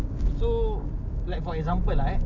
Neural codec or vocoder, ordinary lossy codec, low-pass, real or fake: none; none; 7.2 kHz; real